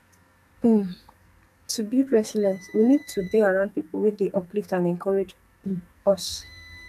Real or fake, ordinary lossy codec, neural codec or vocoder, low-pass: fake; none; codec, 44.1 kHz, 2.6 kbps, SNAC; 14.4 kHz